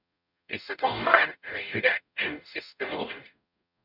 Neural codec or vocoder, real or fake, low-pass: codec, 44.1 kHz, 0.9 kbps, DAC; fake; 5.4 kHz